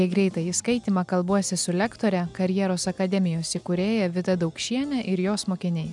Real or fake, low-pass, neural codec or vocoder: real; 10.8 kHz; none